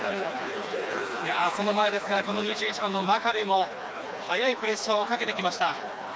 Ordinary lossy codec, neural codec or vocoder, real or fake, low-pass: none; codec, 16 kHz, 2 kbps, FreqCodec, smaller model; fake; none